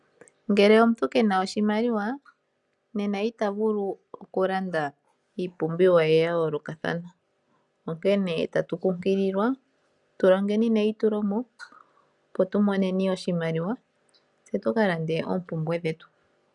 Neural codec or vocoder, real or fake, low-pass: none; real; 10.8 kHz